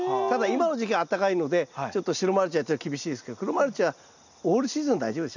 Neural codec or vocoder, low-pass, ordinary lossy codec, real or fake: none; 7.2 kHz; none; real